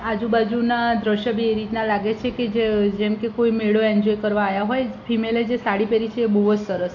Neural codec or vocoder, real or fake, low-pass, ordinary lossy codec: none; real; 7.2 kHz; AAC, 48 kbps